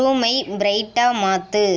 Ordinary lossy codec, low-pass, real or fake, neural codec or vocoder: none; none; real; none